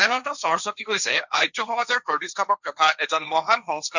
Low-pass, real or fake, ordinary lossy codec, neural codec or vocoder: 7.2 kHz; fake; none; codec, 16 kHz, 1.1 kbps, Voila-Tokenizer